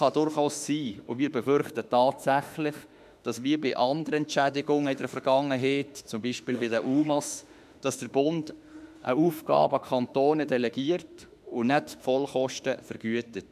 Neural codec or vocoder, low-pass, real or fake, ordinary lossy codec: autoencoder, 48 kHz, 32 numbers a frame, DAC-VAE, trained on Japanese speech; 14.4 kHz; fake; none